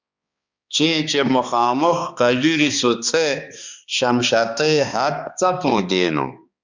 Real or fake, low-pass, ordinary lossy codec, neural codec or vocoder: fake; 7.2 kHz; Opus, 64 kbps; codec, 16 kHz, 2 kbps, X-Codec, HuBERT features, trained on balanced general audio